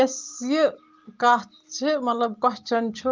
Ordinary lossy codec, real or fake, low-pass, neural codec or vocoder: Opus, 24 kbps; real; 7.2 kHz; none